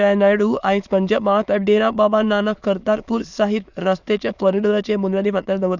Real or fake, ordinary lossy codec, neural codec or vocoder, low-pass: fake; none; autoencoder, 22.05 kHz, a latent of 192 numbers a frame, VITS, trained on many speakers; 7.2 kHz